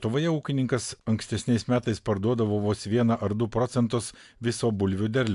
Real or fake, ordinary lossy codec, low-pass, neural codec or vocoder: real; AAC, 64 kbps; 10.8 kHz; none